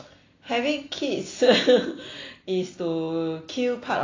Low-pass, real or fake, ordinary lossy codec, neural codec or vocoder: 7.2 kHz; real; AAC, 32 kbps; none